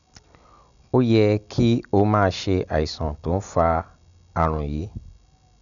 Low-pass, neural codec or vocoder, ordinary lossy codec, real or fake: 7.2 kHz; none; none; real